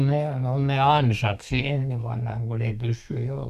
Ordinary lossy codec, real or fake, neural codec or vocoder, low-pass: none; fake; codec, 32 kHz, 1.9 kbps, SNAC; 14.4 kHz